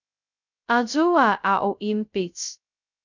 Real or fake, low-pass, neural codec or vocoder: fake; 7.2 kHz; codec, 16 kHz, 0.2 kbps, FocalCodec